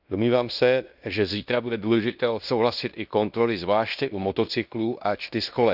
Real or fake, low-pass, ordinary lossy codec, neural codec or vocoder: fake; 5.4 kHz; none; codec, 16 kHz in and 24 kHz out, 0.9 kbps, LongCat-Audio-Codec, fine tuned four codebook decoder